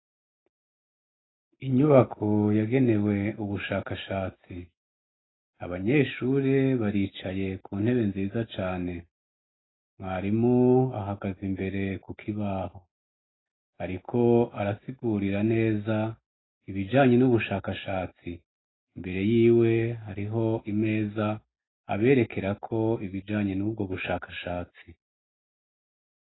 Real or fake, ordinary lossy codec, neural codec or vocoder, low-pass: real; AAC, 16 kbps; none; 7.2 kHz